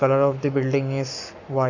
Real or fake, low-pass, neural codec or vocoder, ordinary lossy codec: fake; 7.2 kHz; codec, 44.1 kHz, 7.8 kbps, Pupu-Codec; none